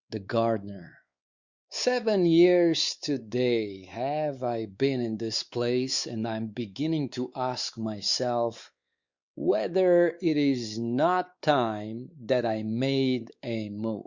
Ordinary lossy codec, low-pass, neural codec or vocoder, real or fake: Opus, 64 kbps; 7.2 kHz; codec, 16 kHz, 4 kbps, X-Codec, WavLM features, trained on Multilingual LibriSpeech; fake